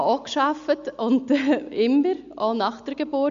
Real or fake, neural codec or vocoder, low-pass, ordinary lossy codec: real; none; 7.2 kHz; MP3, 64 kbps